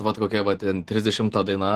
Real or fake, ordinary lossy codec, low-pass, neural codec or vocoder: fake; Opus, 16 kbps; 14.4 kHz; vocoder, 44.1 kHz, 128 mel bands every 512 samples, BigVGAN v2